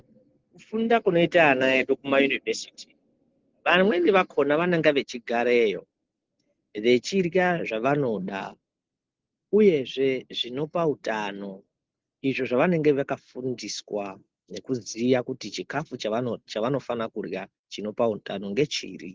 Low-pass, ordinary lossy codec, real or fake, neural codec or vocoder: 7.2 kHz; Opus, 16 kbps; real; none